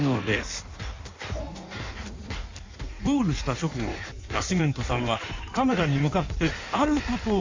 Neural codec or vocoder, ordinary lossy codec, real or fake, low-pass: codec, 16 kHz in and 24 kHz out, 1.1 kbps, FireRedTTS-2 codec; MP3, 64 kbps; fake; 7.2 kHz